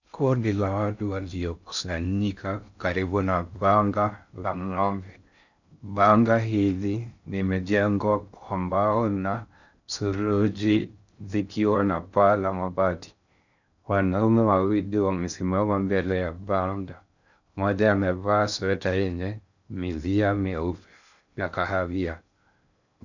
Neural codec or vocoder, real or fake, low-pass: codec, 16 kHz in and 24 kHz out, 0.6 kbps, FocalCodec, streaming, 2048 codes; fake; 7.2 kHz